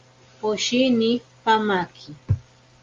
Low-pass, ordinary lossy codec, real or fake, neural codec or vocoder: 7.2 kHz; Opus, 32 kbps; real; none